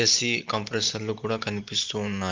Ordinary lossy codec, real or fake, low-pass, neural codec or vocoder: Opus, 24 kbps; real; 7.2 kHz; none